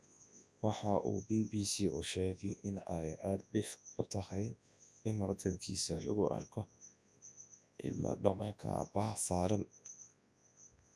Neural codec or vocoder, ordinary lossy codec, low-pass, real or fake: codec, 24 kHz, 0.9 kbps, WavTokenizer, large speech release; none; none; fake